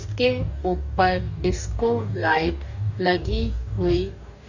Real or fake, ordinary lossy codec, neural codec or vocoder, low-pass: fake; none; codec, 44.1 kHz, 2.6 kbps, DAC; 7.2 kHz